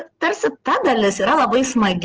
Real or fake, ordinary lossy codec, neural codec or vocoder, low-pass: real; Opus, 16 kbps; none; 7.2 kHz